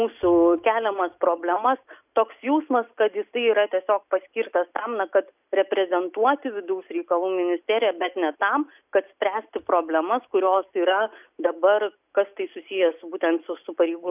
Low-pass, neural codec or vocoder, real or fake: 3.6 kHz; none; real